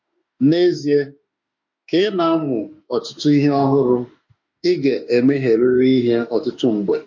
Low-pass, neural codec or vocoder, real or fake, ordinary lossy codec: 7.2 kHz; autoencoder, 48 kHz, 32 numbers a frame, DAC-VAE, trained on Japanese speech; fake; MP3, 48 kbps